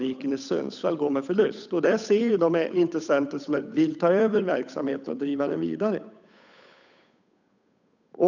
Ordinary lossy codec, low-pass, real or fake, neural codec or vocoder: none; 7.2 kHz; fake; codec, 16 kHz, 8 kbps, FunCodec, trained on Chinese and English, 25 frames a second